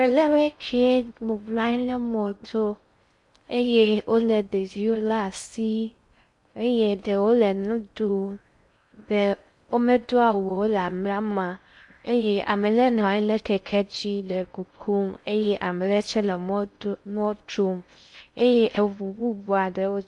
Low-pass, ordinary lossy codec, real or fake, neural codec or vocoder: 10.8 kHz; MP3, 64 kbps; fake; codec, 16 kHz in and 24 kHz out, 0.6 kbps, FocalCodec, streaming, 4096 codes